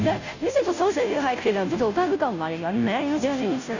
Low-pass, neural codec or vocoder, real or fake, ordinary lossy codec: 7.2 kHz; codec, 16 kHz, 0.5 kbps, FunCodec, trained on Chinese and English, 25 frames a second; fake; none